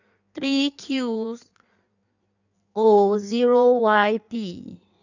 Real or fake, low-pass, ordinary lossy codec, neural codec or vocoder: fake; 7.2 kHz; none; codec, 16 kHz in and 24 kHz out, 1.1 kbps, FireRedTTS-2 codec